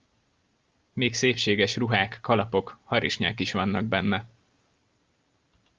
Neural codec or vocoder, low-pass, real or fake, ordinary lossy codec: none; 7.2 kHz; real; Opus, 32 kbps